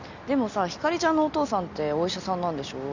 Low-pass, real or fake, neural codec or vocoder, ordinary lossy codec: 7.2 kHz; real; none; none